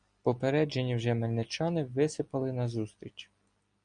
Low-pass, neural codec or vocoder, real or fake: 9.9 kHz; none; real